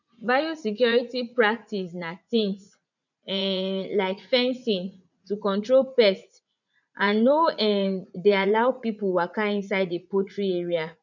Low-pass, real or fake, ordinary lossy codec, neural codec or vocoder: 7.2 kHz; fake; none; vocoder, 44.1 kHz, 80 mel bands, Vocos